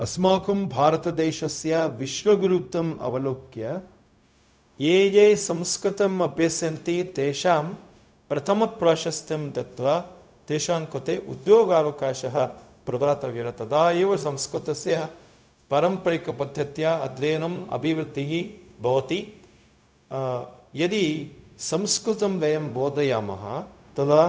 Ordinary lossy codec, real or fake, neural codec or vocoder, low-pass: none; fake; codec, 16 kHz, 0.4 kbps, LongCat-Audio-Codec; none